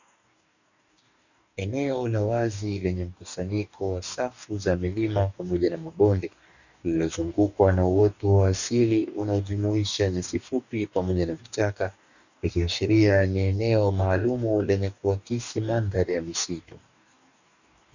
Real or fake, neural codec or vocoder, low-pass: fake; codec, 44.1 kHz, 2.6 kbps, DAC; 7.2 kHz